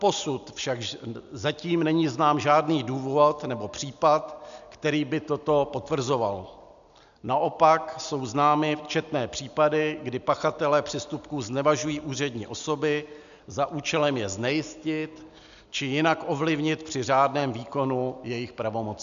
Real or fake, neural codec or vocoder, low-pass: real; none; 7.2 kHz